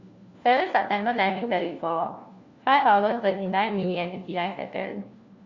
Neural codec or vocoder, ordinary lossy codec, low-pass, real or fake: codec, 16 kHz, 1 kbps, FunCodec, trained on LibriTTS, 50 frames a second; Opus, 64 kbps; 7.2 kHz; fake